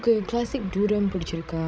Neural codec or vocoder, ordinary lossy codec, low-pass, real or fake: codec, 16 kHz, 16 kbps, FreqCodec, larger model; none; none; fake